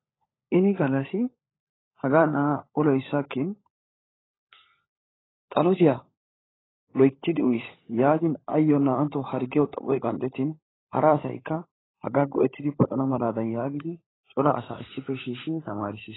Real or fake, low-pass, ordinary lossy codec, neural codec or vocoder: fake; 7.2 kHz; AAC, 16 kbps; codec, 16 kHz, 16 kbps, FunCodec, trained on LibriTTS, 50 frames a second